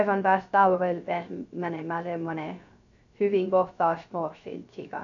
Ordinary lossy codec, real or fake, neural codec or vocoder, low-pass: none; fake; codec, 16 kHz, 0.3 kbps, FocalCodec; 7.2 kHz